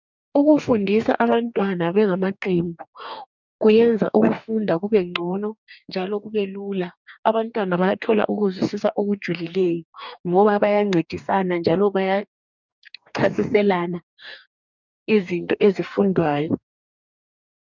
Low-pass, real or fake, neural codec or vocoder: 7.2 kHz; fake; codec, 44.1 kHz, 2.6 kbps, DAC